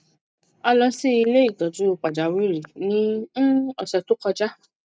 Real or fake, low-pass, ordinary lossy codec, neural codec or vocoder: real; none; none; none